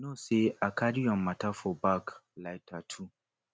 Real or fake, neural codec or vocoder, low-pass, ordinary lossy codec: real; none; none; none